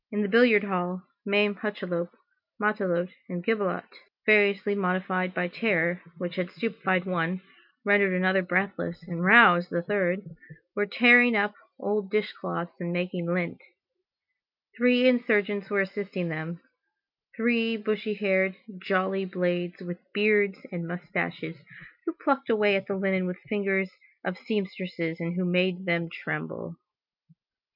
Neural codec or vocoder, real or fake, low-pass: none; real; 5.4 kHz